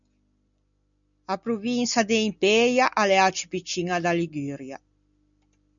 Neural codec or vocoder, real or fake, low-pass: none; real; 7.2 kHz